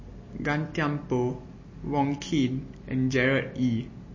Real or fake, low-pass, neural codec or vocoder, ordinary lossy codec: real; 7.2 kHz; none; MP3, 32 kbps